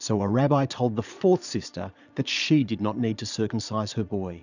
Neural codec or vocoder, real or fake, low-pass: vocoder, 22.05 kHz, 80 mel bands, Vocos; fake; 7.2 kHz